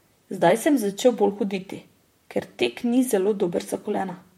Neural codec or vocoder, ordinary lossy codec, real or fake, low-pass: vocoder, 44.1 kHz, 128 mel bands, Pupu-Vocoder; MP3, 64 kbps; fake; 19.8 kHz